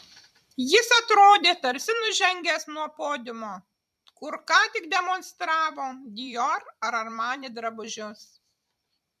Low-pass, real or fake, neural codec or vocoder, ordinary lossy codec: 14.4 kHz; fake; vocoder, 44.1 kHz, 128 mel bands every 256 samples, BigVGAN v2; MP3, 96 kbps